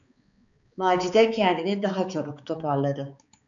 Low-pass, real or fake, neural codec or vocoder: 7.2 kHz; fake; codec, 16 kHz, 4 kbps, X-Codec, HuBERT features, trained on balanced general audio